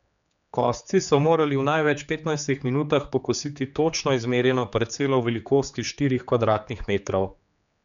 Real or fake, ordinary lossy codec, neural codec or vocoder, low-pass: fake; none; codec, 16 kHz, 4 kbps, X-Codec, HuBERT features, trained on general audio; 7.2 kHz